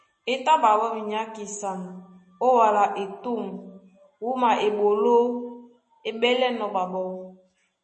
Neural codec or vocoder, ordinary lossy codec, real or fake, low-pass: none; MP3, 32 kbps; real; 10.8 kHz